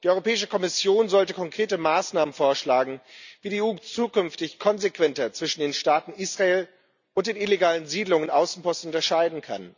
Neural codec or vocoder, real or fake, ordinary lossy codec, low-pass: none; real; none; 7.2 kHz